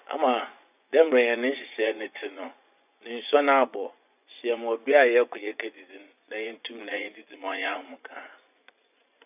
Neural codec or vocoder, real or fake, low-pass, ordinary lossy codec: vocoder, 24 kHz, 100 mel bands, Vocos; fake; 3.6 kHz; none